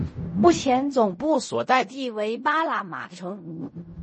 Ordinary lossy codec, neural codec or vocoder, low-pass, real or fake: MP3, 32 kbps; codec, 16 kHz in and 24 kHz out, 0.4 kbps, LongCat-Audio-Codec, fine tuned four codebook decoder; 10.8 kHz; fake